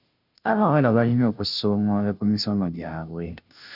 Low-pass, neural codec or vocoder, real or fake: 5.4 kHz; codec, 16 kHz, 0.5 kbps, FunCodec, trained on Chinese and English, 25 frames a second; fake